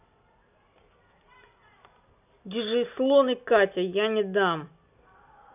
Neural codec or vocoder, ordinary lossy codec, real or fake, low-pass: none; none; real; 3.6 kHz